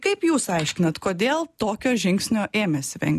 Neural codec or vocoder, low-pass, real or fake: none; 14.4 kHz; real